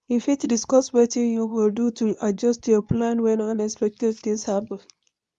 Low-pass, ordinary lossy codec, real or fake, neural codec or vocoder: none; none; fake; codec, 24 kHz, 0.9 kbps, WavTokenizer, medium speech release version 2